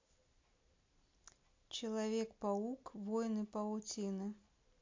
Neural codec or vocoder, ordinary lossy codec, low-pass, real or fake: none; MP3, 48 kbps; 7.2 kHz; real